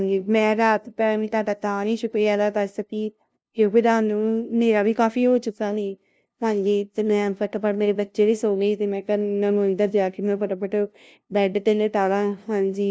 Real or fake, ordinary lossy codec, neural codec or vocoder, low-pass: fake; none; codec, 16 kHz, 0.5 kbps, FunCodec, trained on LibriTTS, 25 frames a second; none